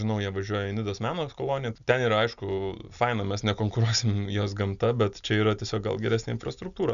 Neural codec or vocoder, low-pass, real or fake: none; 7.2 kHz; real